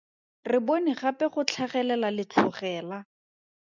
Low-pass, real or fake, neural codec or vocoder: 7.2 kHz; real; none